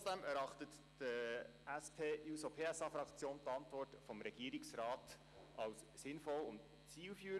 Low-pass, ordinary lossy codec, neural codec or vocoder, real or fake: none; none; none; real